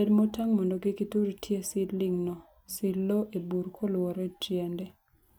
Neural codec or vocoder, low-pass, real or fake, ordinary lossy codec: none; none; real; none